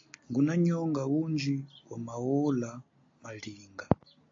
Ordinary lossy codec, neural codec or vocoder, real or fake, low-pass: AAC, 48 kbps; none; real; 7.2 kHz